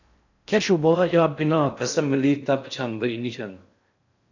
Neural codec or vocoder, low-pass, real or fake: codec, 16 kHz in and 24 kHz out, 0.6 kbps, FocalCodec, streaming, 4096 codes; 7.2 kHz; fake